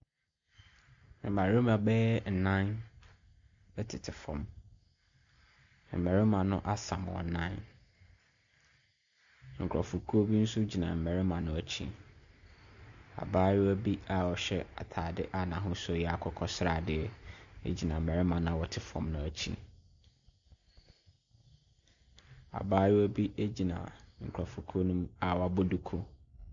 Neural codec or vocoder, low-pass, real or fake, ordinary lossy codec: none; 7.2 kHz; real; MP3, 64 kbps